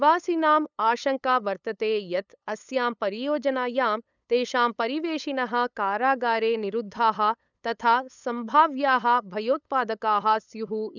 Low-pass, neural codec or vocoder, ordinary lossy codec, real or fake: 7.2 kHz; codec, 16 kHz, 8 kbps, FunCodec, trained on LibriTTS, 25 frames a second; none; fake